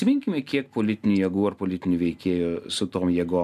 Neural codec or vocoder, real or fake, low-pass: none; real; 14.4 kHz